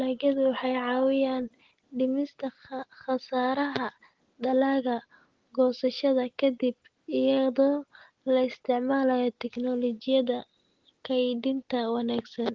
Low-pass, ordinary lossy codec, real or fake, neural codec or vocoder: 7.2 kHz; Opus, 16 kbps; real; none